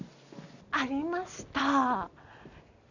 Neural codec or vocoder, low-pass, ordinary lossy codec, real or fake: none; 7.2 kHz; none; real